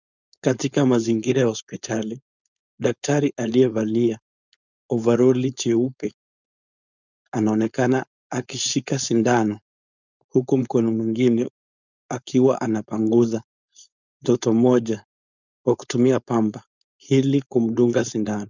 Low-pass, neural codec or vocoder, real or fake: 7.2 kHz; codec, 16 kHz, 4.8 kbps, FACodec; fake